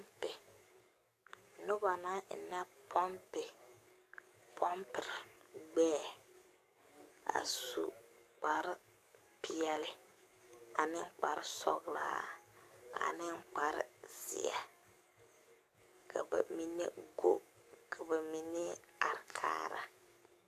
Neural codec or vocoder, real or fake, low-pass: codec, 44.1 kHz, 7.8 kbps, DAC; fake; 14.4 kHz